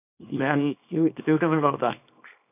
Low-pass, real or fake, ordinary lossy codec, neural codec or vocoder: 3.6 kHz; fake; none; codec, 24 kHz, 0.9 kbps, WavTokenizer, small release